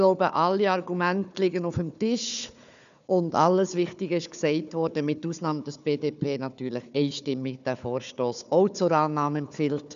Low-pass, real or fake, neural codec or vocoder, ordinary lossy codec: 7.2 kHz; fake; codec, 16 kHz, 4 kbps, FunCodec, trained on Chinese and English, 50 frames a second; none